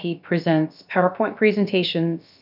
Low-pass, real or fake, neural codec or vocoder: 5.4 kHz; fake; codec, 16 kHz, about 1 kbps, DyCAST, with the encoder's durations